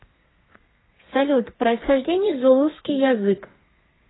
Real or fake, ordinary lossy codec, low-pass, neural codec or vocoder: fake; AAC, 16 kbps; 7.2 kHz; codec, 32 kHz, 1.9 kbps, SNAC